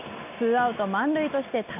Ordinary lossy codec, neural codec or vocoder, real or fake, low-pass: none; none; real; 3.6 kHz